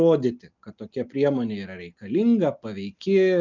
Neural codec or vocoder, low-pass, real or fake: none; 7.2 kHz; real